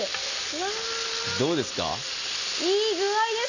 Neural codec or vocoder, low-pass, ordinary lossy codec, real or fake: none; 7.2 kHz; none; real